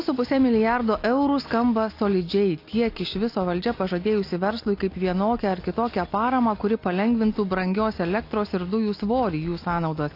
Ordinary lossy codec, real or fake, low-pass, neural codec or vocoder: AAC, 32 kbps; real; 5.4 kHz; none